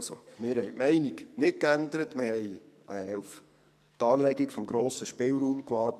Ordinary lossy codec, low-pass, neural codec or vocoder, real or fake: MP3, 96 kbps; 14.4 kHz; codec, 44.1 kHz, 2.6 kbps, SNAC; fake